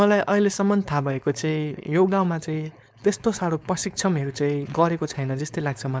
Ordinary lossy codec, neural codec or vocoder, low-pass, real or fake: none; codec, 16 kHz, 4.8 kbps, FACodec; none; fake